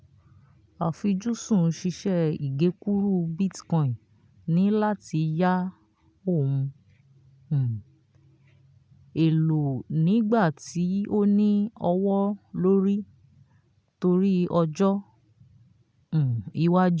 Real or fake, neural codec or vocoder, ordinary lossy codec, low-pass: real; none; none; none